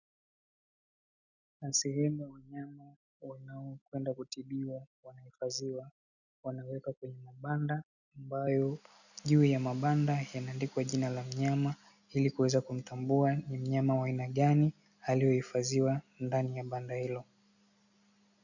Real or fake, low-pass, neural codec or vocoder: real; 7.2 kHz; none